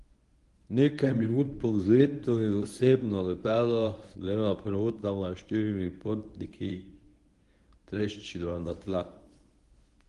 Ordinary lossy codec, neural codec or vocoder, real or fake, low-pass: Opus, 24 kbps; codec, 24 kHz, 0.9 kbps, WavTokenizer, medium speech release version 1; fake; 10.8 kHz